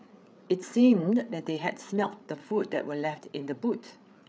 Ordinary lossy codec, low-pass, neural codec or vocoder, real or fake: none; none; codec, 16 kHz, 8 kbps, FreqCodec, larger model; fake